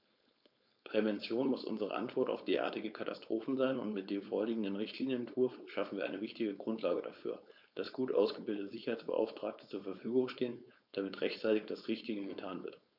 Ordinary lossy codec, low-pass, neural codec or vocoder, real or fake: none; 5.4 kHz; codec, 16 kHz, 4.8 kbps, FACodec; fake